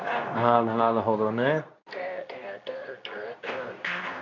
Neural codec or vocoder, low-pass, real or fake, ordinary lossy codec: codec, 24 kHz, 0.9 kbps, WavTokenizer, medium speech release version 2; 7.2 kHz; fake; none